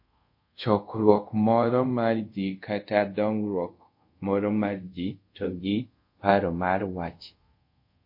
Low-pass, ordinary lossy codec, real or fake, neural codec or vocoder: 5.4 kHz; MP3, 32 kbps; fake; codec, 24 kHz, 0.5 kbps, DualCodec